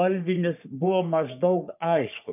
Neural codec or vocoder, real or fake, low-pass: codec, 44.1 kHz, 3.4 kbps, Pupu-Codec; fake; 3.6 kHz